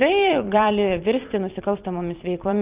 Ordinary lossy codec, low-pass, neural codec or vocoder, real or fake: Opus, 24 kbps; 3.6 kHz; vocoder, 44.1 kHz, 128 mel bands every 512 samples, BigVGAN v2; fake